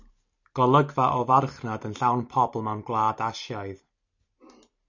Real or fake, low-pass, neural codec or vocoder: real; 7.2 kHz; none